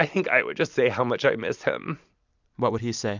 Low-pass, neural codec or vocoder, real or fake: 7.2 kHz; none; real